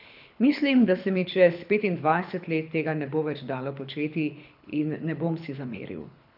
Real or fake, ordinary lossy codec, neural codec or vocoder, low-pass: fake; none; codec, 24 kHz, 6 kbps, HILCodec; 5.4 kHz